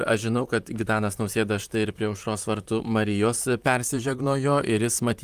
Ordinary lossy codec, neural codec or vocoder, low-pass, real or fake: Opus, 32 kbps; vocoder, 44.1 kHz, 128 mel bands, Pupu-Vocoder; 14.4 kHz; fake